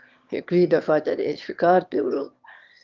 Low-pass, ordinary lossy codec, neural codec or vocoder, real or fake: 7.2 kHz; Opus, 32 kbps; autoencoder, 22.05 kHz, a latent of 192 numbers a frame, VITS, trained on one speaker; fake